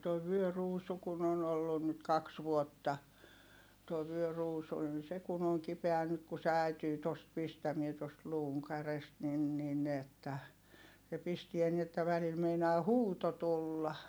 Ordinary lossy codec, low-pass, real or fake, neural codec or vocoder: none; none; real; none